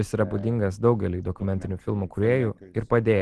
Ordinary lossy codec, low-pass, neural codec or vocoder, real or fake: Opus, 16 kbps; 10.8 kHz; none; real